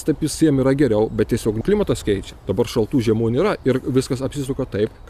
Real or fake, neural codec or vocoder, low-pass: fake; vocoder, 44.1 kHz, 128 mel bands every 256 samples, BigVGAN v2; 14.4 kHz